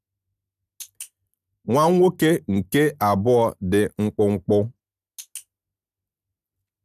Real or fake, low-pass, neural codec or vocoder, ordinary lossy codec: real; 14.4 kHz; none; none